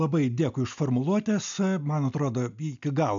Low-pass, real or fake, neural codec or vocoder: 7.2 kHz; real; none